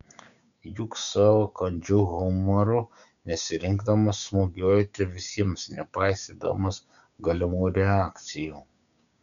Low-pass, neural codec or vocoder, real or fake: 7.2 kHz; codec, 16 kHz, 6 kbps, DAC; fake